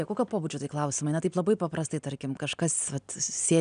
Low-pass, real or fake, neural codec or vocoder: 9.9 kHz; real; none